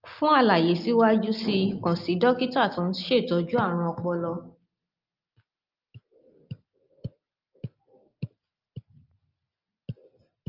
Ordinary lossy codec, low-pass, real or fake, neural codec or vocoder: Opus, 24 kbps; 5.4 kHz; real; none